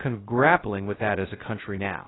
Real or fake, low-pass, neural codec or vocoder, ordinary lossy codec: fake; 7.2 kHz; codec, 16 kHz, 0.2 kbps, FocalCodec; AAC, 16 kbps